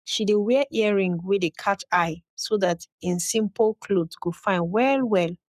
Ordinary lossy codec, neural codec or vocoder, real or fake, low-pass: none; codec, 44.1 kHz, 7.8 kbps, Pupu-Codec; fake; 14.4 kHz